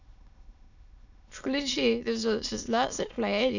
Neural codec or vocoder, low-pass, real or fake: autoencoder, 22.05 kHz, a latent of 192 numbers a frame, VITS, trained on many speakers; 7.2 kHz; fake